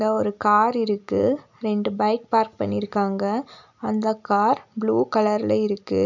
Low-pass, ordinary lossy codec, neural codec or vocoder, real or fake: 7.2 kHz; none; none; real